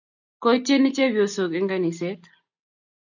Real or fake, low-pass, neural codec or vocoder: real; 7.2 kHz; none